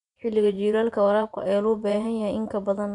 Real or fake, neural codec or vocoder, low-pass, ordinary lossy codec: fake; vocoder, 24 kHz, 100 mel bands, Vocos; 10.8 kHz; none